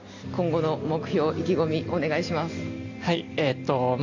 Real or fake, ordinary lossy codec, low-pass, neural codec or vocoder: real; AAC, 48 kbps; 7.2 kHz; none